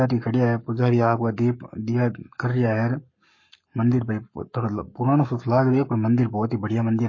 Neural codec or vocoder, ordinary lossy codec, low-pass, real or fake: codec, 44.1 kHz, 7.8 kbps, Pupu-Codec; MP3, 32 kbps; 7.2 kHz; fake